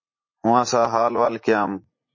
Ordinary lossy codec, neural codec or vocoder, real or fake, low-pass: MP3, 32 kbps; vocoder, 44.1 kHz, 80 mel bands, Vocos; fake; 7.2 kHz